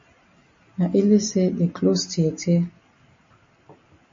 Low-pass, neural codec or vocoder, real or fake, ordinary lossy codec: 7.2 kHz; none; real; MP3, 32 kbps